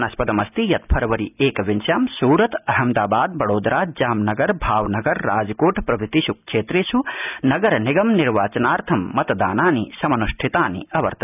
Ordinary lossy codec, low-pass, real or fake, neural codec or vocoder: none; 3.6 kHz; real; none